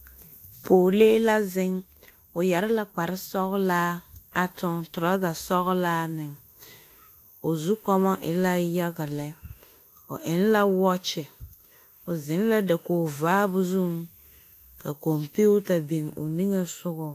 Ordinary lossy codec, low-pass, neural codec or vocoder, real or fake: AAC, 64 kbps; 14.4 kHz; autoencoder, 48 kHz, 32 numbers a frame, DAC-VAE, trained on Japanese speech; fake